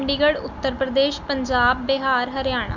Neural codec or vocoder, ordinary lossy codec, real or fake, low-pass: none; none; real; 7.2 kHz